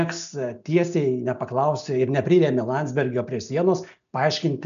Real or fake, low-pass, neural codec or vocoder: real; 7.2 kHz; none